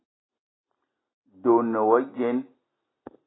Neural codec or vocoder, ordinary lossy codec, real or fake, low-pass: none; AAC, 16 kbps; real; 7.2 kHz